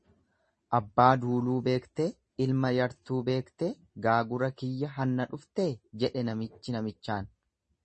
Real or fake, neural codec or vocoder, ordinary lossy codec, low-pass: real; none; MP3, 32 kbps; 10.8 kHz